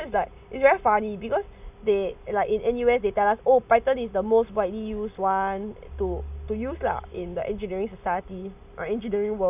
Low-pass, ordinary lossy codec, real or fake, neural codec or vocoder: 3.6 kHz; none; real; none